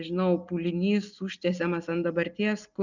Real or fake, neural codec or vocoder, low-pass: real; none; 7.2 kHz